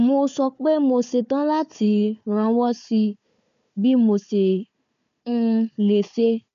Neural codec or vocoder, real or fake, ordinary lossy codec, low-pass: codec, 16 kHz, 4 kbps, FunCodec, trained on Chinese and English, 50 frames a second; fake; none; 7.2 kHz